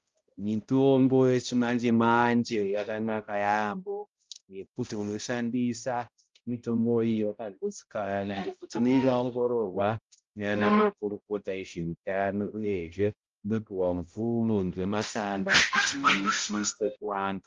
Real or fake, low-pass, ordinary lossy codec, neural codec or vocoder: fake; 7.2 kHz; Opus, 32 kbps; codec, 16 kHz, 0.5 kbps, X-Codec, HuBERT features, trained on balanced general audio